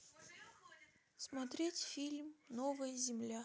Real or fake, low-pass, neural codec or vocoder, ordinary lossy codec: real; none; none; none